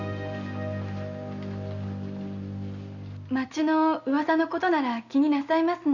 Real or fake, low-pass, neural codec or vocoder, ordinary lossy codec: real; 7.2 kHz; none; none